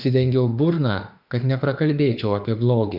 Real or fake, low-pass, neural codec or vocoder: fake; 5.4 kHz; autoencoder, 48 kHz, 32 numbers a frame, DAC-VAE, trained on Japanese speech